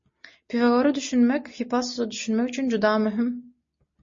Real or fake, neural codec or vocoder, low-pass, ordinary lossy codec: real; none; 7.2 kHz; MP3, 32 kbps